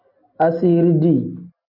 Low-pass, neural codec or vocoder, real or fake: 5.4 kHz; none; real